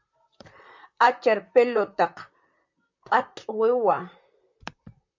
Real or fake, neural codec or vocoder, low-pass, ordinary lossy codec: fake; vocoder, 44.1 kHz, 128 mel bands, Pupu-Vocoder; 7.2 kHz; MP3, 64 kbps